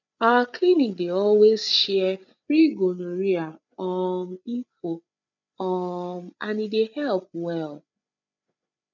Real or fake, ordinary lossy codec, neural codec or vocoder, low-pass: fake; none; codec, 16 kHz, 8 kbps, FreqCodec, larger model; 7.2 kHz